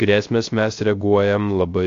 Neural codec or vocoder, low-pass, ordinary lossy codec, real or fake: codec, 16 kHz, 0.3 kbps, FocalCodec; 7.2 kHz; AAC, 48 kbps; fake